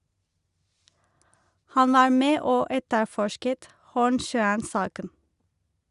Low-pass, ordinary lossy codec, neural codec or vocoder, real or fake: 10.8 kHz; Opus, 64 kbps; none; real